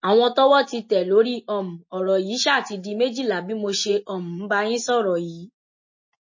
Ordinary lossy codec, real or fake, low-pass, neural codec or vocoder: MP3, 32 kbps; real; 7.2 kHz; none